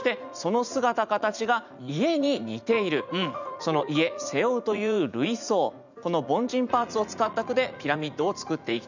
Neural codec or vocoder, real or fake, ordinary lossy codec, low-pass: vocoder, 44.1 kHz, 80 mel bands, Vocos; fake; none; 7.2 kHz